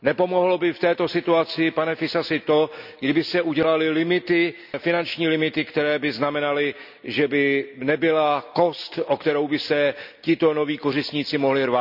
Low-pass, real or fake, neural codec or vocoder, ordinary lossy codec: 5.4 kHz; real; none; none